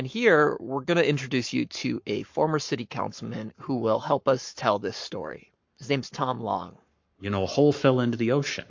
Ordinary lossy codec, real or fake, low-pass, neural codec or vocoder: MP3, 48 kbps; fake; 7.2 kHz; codec, 44.1 kHz, 7.8 kbps, Pupu-Codec